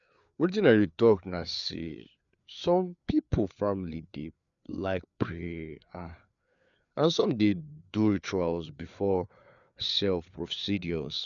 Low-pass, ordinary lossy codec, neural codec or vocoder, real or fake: 7.2 kHz; none; codec, 16 kHz, 4 kbps, FreqCodec, larger model; fake